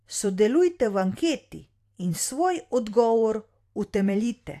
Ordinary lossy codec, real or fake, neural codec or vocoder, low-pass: AAC, 64 kbps; fake; vocoder, 44.1 kHz, 128 mel bands every 512 samples, BigVGAN v2; 14.4 kHz